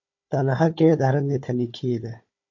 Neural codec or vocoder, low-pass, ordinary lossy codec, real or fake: codec, 16 kHz, 4 kbps, FunCodec, trained on Chinese and English, 50 frames a second; 7.2 kHz; MP3, 48 kbps; fake